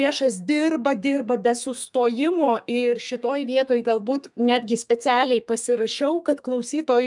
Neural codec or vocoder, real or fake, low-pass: codec, 32 kHz, 1.9 kbps, SNAC; fake; 10.8 kHz